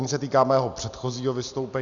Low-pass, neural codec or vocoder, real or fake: 7.2 kHz; none; real